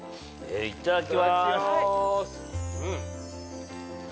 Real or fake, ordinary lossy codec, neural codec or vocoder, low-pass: real; none; none; none